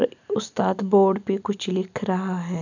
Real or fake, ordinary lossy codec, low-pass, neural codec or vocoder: real; none; 7.2 kHz; none